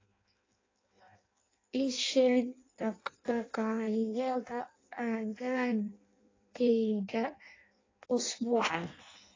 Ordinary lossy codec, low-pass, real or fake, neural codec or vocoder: AAC, 32 kbps; 7.2 kHz; fake; codec, 16 kHz in and 24 kHz out, 0.6 kbps, FireRedTTS-2 codec